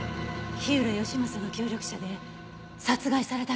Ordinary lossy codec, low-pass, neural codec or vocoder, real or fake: none; none; none; real